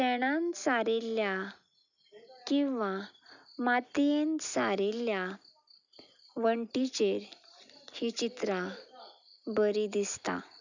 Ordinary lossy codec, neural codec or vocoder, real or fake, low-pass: none; none; real; 7.2 kHz